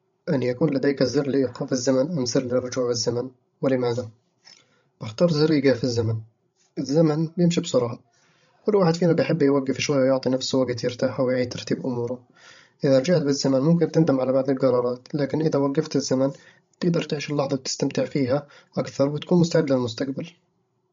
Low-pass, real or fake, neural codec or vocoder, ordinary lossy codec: 7.2 kHz; fake; codec, 16 kHz, 16 kbps, FreqCodec, larger model; MP3, 48 kbps